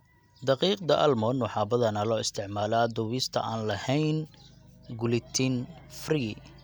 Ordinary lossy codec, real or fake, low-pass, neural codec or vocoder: none; real; none; none